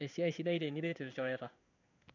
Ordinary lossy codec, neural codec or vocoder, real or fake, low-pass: none; codec, 16 kHz in and 24 kHz out, 1 kbps, XY-Tokenizer; fake; 7.2 kHz